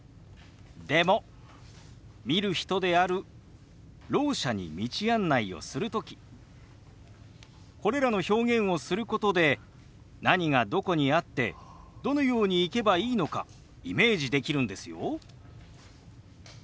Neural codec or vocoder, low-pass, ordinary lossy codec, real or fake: none; none; none; real